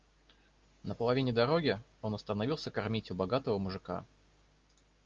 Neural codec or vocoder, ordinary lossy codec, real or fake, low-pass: none; Opus, 32 kbps; real; 7.2 kHz